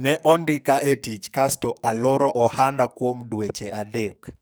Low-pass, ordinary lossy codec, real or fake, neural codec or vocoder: none; none; fake; codec, 44.1 kHz, 2.6 kbps, SNAC